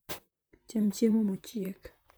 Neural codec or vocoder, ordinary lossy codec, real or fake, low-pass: vocoder, 44.1 kHz, 128 mel bands, Pupu-Vocoder; none; fake; none